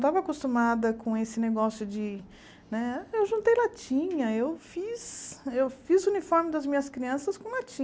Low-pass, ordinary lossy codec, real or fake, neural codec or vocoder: none; none; real; none